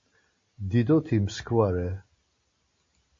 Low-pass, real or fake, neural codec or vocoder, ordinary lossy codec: 7.2 kHz; real; none; MP3, 32 kbps